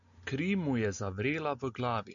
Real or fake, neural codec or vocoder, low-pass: real; none; 7.2 kHz